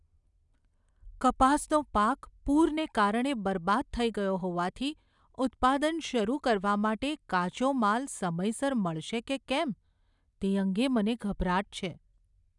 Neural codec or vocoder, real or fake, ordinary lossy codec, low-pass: none; real; none; 10.8 kHz